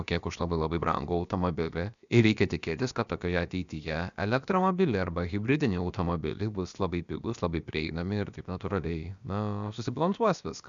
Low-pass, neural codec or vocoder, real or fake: 7.2 kHz; codec, 16 kHz, about 1 kbps, DyCAST, with the encoder's durations; fake